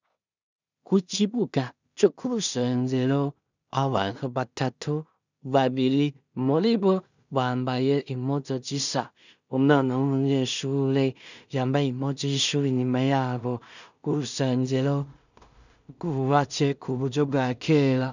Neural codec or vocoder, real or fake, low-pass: codec, 16 kHz in and 24 kHz out, 0.4 kbps, LongCat-Audio-Codec, two codebook decoder; fake; 7.2 kHz